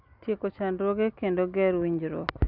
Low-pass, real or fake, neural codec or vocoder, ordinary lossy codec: 5.4 kHz; real; none; Opus, 64 kbps